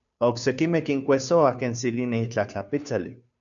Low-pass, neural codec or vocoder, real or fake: 7.2 kHz; codec, 16 kHz, 2 kbps, FunCodec, trained on Chinese and English, 25 frames a second; fake